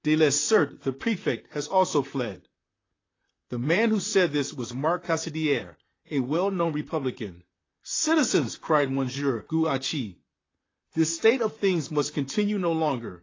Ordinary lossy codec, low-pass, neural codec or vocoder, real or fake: AAC, 32 kbps; 7.2 kHz; vocoder, 44.1 kHz, 128 mel bands, Pupu-Vocoder; fake